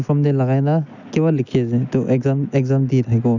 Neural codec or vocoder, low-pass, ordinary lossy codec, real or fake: none; 7.2 kHz; none; real